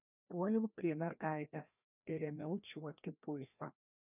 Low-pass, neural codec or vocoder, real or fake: 3.6 kHz; codec, 16 kHz, 1 kbps, FreqCodec, larger model; fake